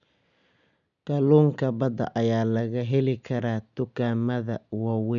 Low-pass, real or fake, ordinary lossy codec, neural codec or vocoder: 7.2 kHz; real; none; none